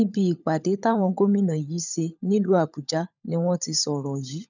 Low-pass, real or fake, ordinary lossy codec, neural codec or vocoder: 7.2 kHz; fake; none; codec, 16 kHz, 16 kbps, FunCodec, trained on LibriTTS, 50 frames a second